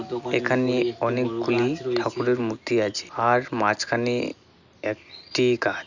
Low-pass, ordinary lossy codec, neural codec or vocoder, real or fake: 7.2 kHz; none; none; real